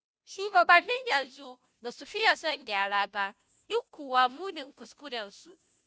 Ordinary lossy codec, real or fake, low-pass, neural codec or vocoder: none; fake; none; codec, 16 kHz, 0.5 kbps, FunCodec, trained on Chinese and English, 25 frames a second